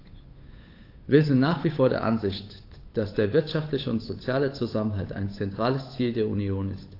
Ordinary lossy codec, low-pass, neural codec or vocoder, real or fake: AAC, 32 kbps; 5.4 kHz; codec, 16 kHz, 8 kbps, FunCodec, trained on Chinese and English, 25 frames a second; fake